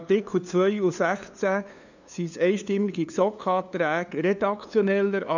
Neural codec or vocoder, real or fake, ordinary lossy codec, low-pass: codec, 16 kHz, 2 kbps, FunCodec, trained on LibriTTS, 25 frames a second; fake; none; 7.2 kHz